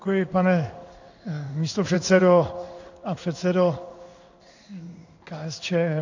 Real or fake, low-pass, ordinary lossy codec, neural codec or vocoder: fake; 7.2 kHz; AAC, 48 kbps; codec, 16 kHz in and 24 kHz out, 1 kbps, XY-Tokenizer